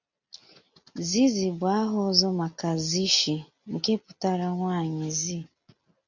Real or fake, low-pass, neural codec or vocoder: real; 7.2 kHz; none